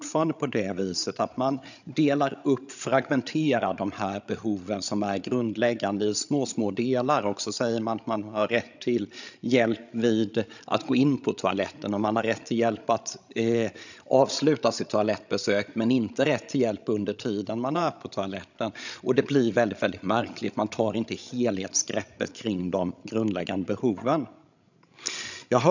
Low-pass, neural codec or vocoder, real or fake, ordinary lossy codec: 7.2 kHz; codec, 16 kHz, 16 kbps, FreqCodec, larger model; fake; none